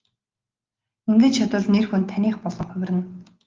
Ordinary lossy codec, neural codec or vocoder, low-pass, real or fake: Opus, 32 kbps; none; 7.2 kHz; real